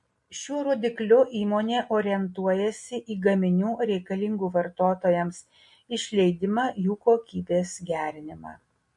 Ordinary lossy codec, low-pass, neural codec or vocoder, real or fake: MP3, 48 kbps; 10.8 kHz; none; real